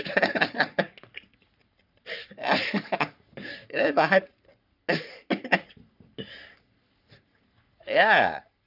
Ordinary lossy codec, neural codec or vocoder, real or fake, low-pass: none; codec, 44.1 kHz, 3.4 kbps, Pupu-Codec; fake; 5.4 kHz